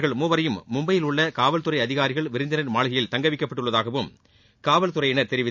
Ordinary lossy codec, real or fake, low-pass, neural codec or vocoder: none; real; 7.2 kHz; none